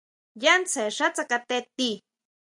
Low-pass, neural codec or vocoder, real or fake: 10.8 kHz; none; real